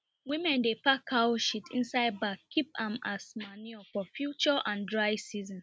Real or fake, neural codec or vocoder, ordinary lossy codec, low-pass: real; none; none; none